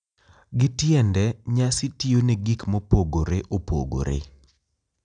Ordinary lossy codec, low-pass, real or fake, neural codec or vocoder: none; 9.9 kHz; real; none